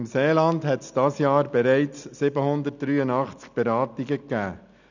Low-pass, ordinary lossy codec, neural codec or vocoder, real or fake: 7.2 kHz; none; none; real